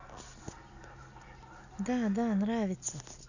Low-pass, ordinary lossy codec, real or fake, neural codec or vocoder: 7.2 kHz; none; real; none